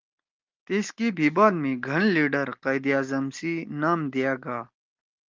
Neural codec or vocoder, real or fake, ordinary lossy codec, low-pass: none; real; Opus, 24 kbps; 7.2 kHz